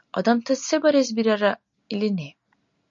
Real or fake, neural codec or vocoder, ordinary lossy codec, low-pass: real; none; MP3, 48 kbps; 7.2 kHz